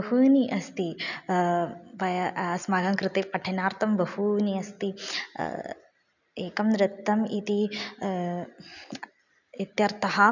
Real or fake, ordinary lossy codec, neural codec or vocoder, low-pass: real; none; none; 7.2 kHz